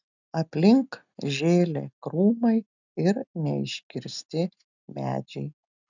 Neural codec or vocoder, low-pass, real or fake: none; 7.2 kHz; real